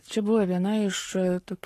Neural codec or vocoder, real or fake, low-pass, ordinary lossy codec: codec, 44.1 kHz, 7.8 kbps, Pupu-Codec; fake; 14.4 kHz; AAC, 48 kbps